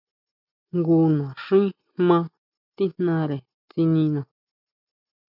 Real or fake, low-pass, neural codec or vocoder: real; 5.4 kHz; none